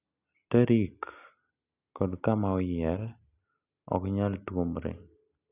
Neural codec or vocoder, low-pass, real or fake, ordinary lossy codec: none; 3.6 kHz; real; none